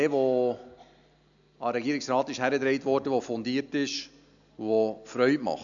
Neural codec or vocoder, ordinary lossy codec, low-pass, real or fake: none; none; 7.2 kHz; real